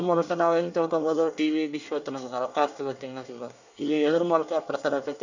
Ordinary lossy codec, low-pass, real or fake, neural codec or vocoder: none; 7.2 kHz; fake; codec, 24 kHz, 1 kbps, SNAC